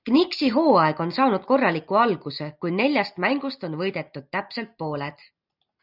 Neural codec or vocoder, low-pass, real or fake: none; 5.4 kHz; real